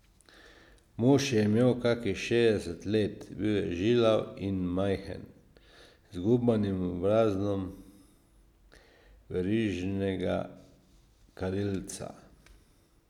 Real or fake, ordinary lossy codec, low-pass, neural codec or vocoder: real; none; 19.8 kHz; none